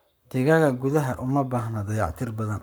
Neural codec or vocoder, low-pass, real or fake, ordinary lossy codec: codec, 44.1 kHz, 7.8 kbps, Pupu-Codec; none; fake; none